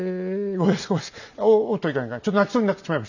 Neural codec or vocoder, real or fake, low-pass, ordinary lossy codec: none; real; 7.2 kHz; none